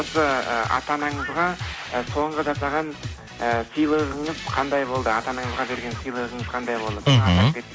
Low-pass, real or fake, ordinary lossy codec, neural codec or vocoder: none; real; none; none